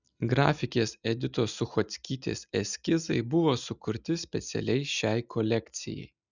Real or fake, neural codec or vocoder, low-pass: real; none; 7.2 kHz